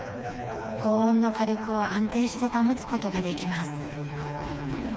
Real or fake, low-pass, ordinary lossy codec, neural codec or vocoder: fake; none; none; codec, 16 kHz, 2 kbps, FreqCodec, smaller model